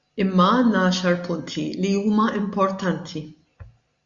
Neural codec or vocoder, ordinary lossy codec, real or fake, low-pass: none; Opus, 64 kbps; real; 7.2 kHz